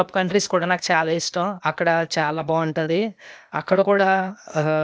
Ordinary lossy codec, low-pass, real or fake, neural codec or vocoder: none; none; fake; codec, 16 kHz, 0.8 kbps, ZipCodec